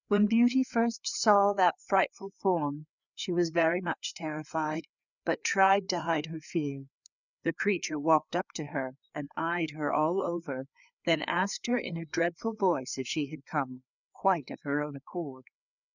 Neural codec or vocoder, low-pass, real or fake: codec, 16 kHz, 4 kbps, FreqCodec, larger model; 7.2 kHz; fake